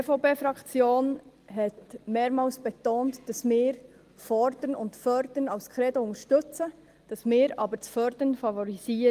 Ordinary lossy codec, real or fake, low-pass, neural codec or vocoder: Opus, 24 kbps; real; 14.4 kHz; none